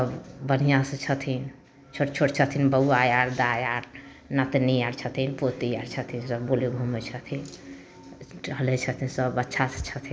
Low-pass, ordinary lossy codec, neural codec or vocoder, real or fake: none; none; none; real